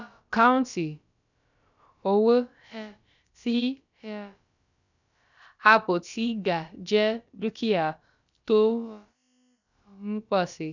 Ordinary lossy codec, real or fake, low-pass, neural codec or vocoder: none; fake; 7.2 kHz; codec, 16 kHz, about 1 kbps, DyCAST, with the encoder's durations